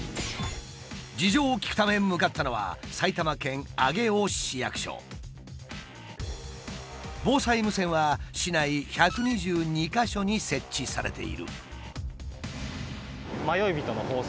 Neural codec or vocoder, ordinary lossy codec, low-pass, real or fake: none; none; none; real